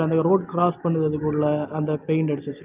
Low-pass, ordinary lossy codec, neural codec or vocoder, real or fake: 3.6 kHz; Opus, 24 kbps; none; real